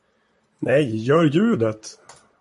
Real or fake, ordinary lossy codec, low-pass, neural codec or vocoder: fake; MP3, 48 kbps; 14.4 kHz; vocoder, 44.1 kHz, 128 mel bands, Pupu-Vocoder